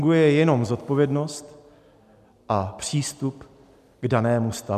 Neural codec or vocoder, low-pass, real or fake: none; 14.4 kHz; real